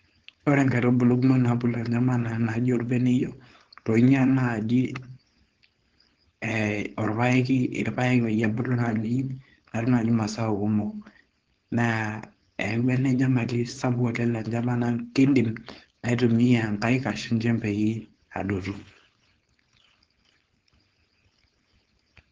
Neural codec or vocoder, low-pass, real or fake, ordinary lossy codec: codec, 16 kHz, 4.8 kbps, FACodec; 7.2 kHz; fake; Opus, 16 kbps